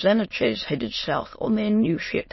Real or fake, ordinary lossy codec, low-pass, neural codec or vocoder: fake; MP3, 24 kbps; 7.2 kHz; autoencoder, 22.05 kHz, a latent of 192 numbers a frame, VITS, trained on many speakers